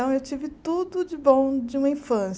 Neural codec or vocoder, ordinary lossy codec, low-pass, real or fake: none; none; none; real